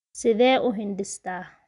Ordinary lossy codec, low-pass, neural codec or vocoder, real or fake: none; 10.8 kHz; none; real